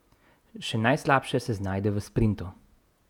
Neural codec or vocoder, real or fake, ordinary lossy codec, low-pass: vocoder, 48 kHz, 128 mel bands, Vocos; fake; none; 19.8 kHz